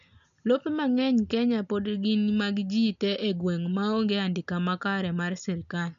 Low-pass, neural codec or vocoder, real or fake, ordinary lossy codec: 7.2 kHz; none; real; none